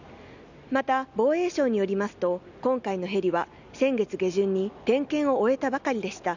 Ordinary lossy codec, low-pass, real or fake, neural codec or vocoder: none; 7.2 kHz; real; none